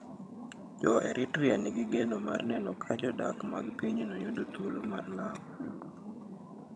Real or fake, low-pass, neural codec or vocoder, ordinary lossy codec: fake; none; vocoder, 22.05 kHz, 80 mel bands, HiFi-GAN; none